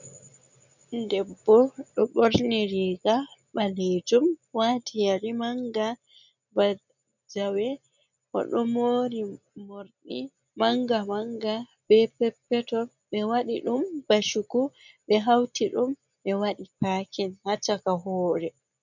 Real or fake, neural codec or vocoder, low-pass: real; none; 7.2 kHz